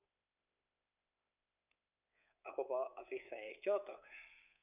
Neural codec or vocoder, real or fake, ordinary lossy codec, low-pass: codec, 24 kHz, 3.1 kbps, DualCodec; fake; none; 3.6 kHz